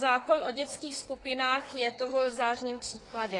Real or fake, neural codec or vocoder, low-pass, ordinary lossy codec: fake; codec, 24 kHz, 1 kbps, SNAC; 10.8 kHz; AAC, 32 kbps